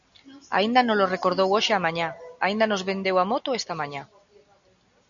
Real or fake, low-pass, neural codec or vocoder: real; 7.2 kHz; none